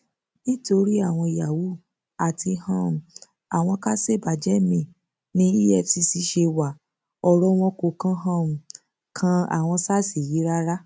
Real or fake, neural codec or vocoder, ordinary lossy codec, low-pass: real; none; none; none